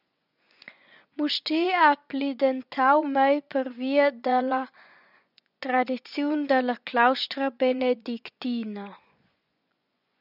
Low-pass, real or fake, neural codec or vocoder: 5.4 kHz; fake; vocoder, 24 kHz, 100 mel bands, Vocos